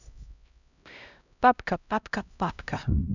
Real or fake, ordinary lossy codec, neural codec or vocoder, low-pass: fake; none; codec, 16 kHz, 0.5 kbps, X-Codec, HuBERT features, trained on LibriSpeech; 7.2 kHz